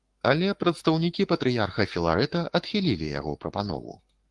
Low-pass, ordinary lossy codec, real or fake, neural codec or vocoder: 10.8 kHz; Opus, 24 kbps; fake; codec, 44.1 kHz, 7.8 kbps, Pupu-Codec